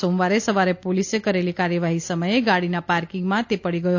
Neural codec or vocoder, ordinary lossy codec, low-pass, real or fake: none; AAC, 48 kbps; 7.2 kHz; real